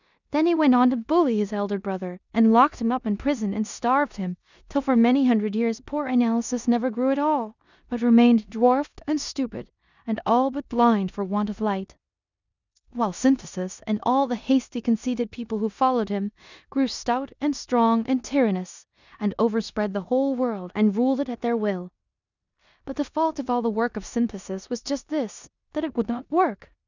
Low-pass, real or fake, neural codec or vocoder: 7.2 kHz; fake; codec, 16 kHz in and 24 kHz out, 0.9 kbps, LongCat-Audio-Codec, four codebook decoder